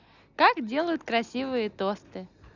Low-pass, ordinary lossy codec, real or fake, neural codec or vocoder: 7.2 kHz; none; real; none